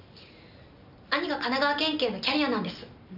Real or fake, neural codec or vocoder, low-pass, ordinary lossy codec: real; none; 5.4 kHz; none